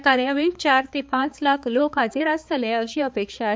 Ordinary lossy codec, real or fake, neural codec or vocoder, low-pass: none; fake; codec, 16 kHz, 4 kbps, X-Codec, HuBERT features, trained on balanced general audio; none